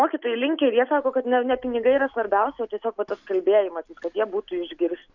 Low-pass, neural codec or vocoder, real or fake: 7.2 kHz; none; real